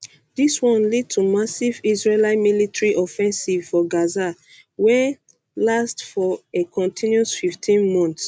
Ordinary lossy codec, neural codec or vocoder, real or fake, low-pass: none; none; real; none